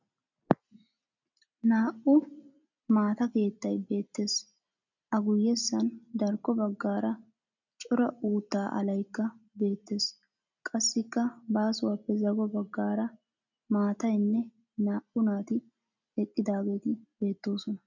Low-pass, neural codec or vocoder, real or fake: 7.2 kHz; none; real